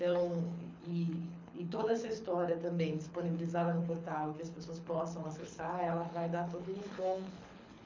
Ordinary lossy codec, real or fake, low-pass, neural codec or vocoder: MP3, 64 kbps; fake; 7.2 kHz; codec, 24 kHz, 6 kbps, HILCodec